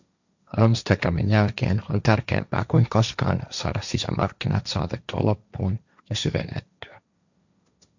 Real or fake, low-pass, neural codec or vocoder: fake; 7.2 kHz; codec, 16 kHz, 1.1 kbps, Voila-Tokenizer